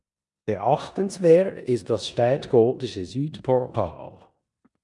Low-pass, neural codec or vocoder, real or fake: 10.8 kHz; codec, 16 kHz in and 24 kHz out, 0.9 kbps, LongCat-Audio-Codec, four codebook decoder; fake